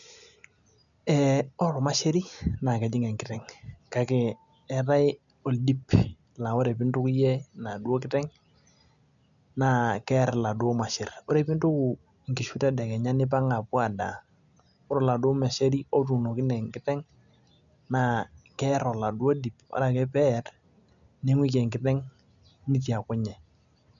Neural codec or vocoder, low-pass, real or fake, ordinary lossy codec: none; 7.2 kHz; real; none